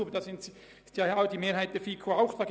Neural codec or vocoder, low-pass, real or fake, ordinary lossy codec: none; none; real; none